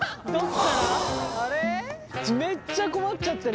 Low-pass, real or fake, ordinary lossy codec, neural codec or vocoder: none; real; none; none